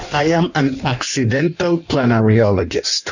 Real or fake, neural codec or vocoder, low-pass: fake; codec, 16 kHz in and 24 kHz out, 1.1 kbps, FireRedTTS-2 codec; 7.2 kHz